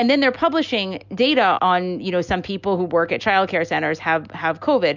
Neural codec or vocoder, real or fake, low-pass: none; real; 7.2 kHz